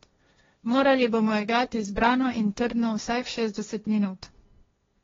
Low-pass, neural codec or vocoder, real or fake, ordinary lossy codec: 7.2 kHz; codec, 16 kHz, 1.1 kbps, Voila-Tokenizer; fake; AAC, 24 kbps